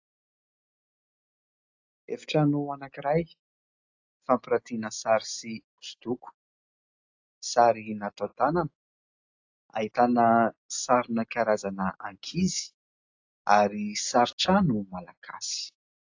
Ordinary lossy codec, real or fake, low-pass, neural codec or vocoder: AAC, 48 kbps; real; 7.2 kHz; none